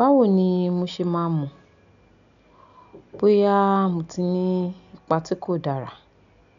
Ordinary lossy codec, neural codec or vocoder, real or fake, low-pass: none; none; real; 7.2 kHz